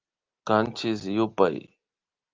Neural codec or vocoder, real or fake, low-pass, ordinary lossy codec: none; real; 7.2 kHz; Opus, 24 kbps